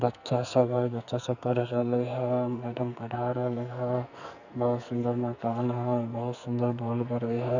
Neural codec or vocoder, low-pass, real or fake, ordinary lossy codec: codec, 44.1 kHz, 2.6 kbps, SNAC; 7.2 kHz; fake; none